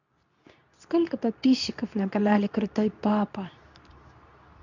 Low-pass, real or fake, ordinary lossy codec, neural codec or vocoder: 7.2 kHz; fake; none; codec, 24 kHz, 0.9 kbps, WavTokenizer, medium speech release version 2